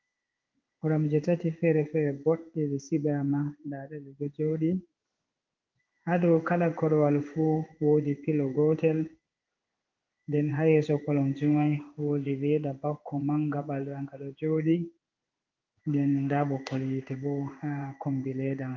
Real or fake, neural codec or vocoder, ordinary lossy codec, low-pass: fake; codec, 16 kHz in and 24 kHz out, 1 kbps, XY-Tokenizer; Opus, 32 kbps; 7.2 kHz